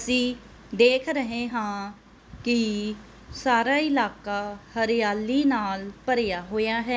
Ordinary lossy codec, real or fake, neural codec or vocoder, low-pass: none; real; none; none